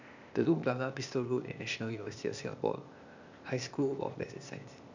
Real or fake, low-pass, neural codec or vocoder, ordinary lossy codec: fake; 7.2 kHz; codec, 16 kHz, 0.8 kbps, ZipCodec; none